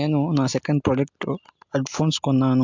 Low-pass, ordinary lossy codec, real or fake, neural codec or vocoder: 7.2 kHz; MP3, 64 kbps; real; none